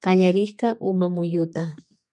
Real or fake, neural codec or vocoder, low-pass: fake; codec, 32 kHz, 1.9 kbps, SNAC; 10.8 kHz